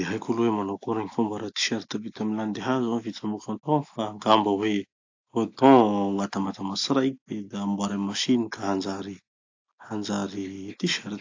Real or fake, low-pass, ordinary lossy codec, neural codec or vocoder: real; 7.2 kHz; AAC, 48 kbps; none